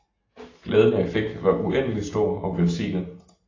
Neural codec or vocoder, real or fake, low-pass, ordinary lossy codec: none; real; 7.2 kHz; AAC, 32 kbps